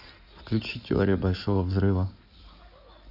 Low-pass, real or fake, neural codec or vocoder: 5.4 kHz; fake; codec, 16 kHz in and 24 kHz out, 2.2 kbps, FireRedTTS-2 codec